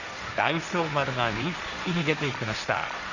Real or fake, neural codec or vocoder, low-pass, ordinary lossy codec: fake; codec, 16 kHz, 1.1 kbps, Voila-Tokenizer; 7.2 kHz; none